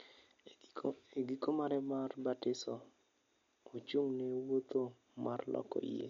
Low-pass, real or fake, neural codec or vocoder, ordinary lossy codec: 7.2 kHz; real; none; MP3, 48 kbps